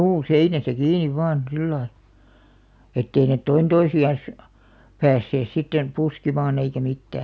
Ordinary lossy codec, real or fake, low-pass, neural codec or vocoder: none; real; none; none